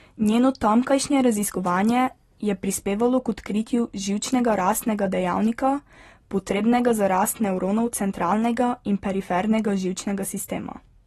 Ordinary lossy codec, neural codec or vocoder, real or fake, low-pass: AAC, 32 kbps; none; real; 19.8 kHz